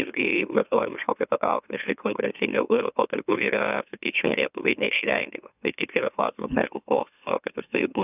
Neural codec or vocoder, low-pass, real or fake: autoencoder, 44.1 kHz, a latent of 192 numbers a frame, MeloTTS; 3.6 kHz; fake